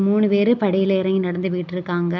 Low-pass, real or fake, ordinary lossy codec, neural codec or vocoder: 7.2 kHz; real; none; none